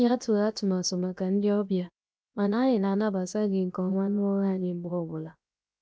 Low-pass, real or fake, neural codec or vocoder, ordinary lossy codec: none; fake; codec, 16 kHz, 0.7 kbps, FocalCodec; none